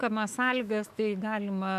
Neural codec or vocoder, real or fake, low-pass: autoencoder, 48 kHz, 128 numbers a frame, DAC-VAE, trained on Japanese speech; fake; 14.4 kHz